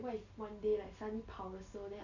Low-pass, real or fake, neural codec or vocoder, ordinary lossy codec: 7.2 kHz; real; none; none